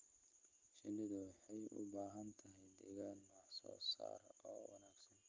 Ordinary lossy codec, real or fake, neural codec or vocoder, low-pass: Opus, 24 kbps; real; none; 7.2 kHz